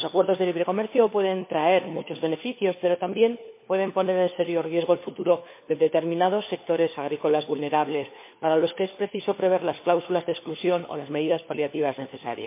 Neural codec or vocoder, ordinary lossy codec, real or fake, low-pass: codec, 16 kHz, 4 kbps, FunCodec, trained on LibriTTS, 50 frames a second; MP3, 24 kbps; fake; 3.6 kHz